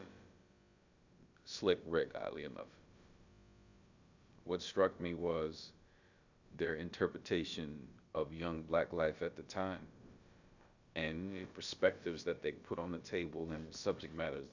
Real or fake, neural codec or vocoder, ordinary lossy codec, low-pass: fake; codec, 16 kHz, about 1 kbps, DyCAST, with the encoder's durations; Opus, 64 kbps; 7.2 kHz